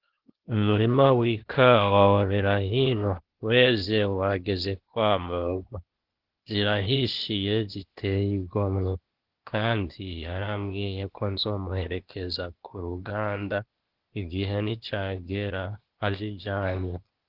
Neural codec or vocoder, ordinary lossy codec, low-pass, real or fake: codec, 16 kHz, 0.8 kbps, ZipCodec; Opus, 16 kbps; 5.4 kHz; fake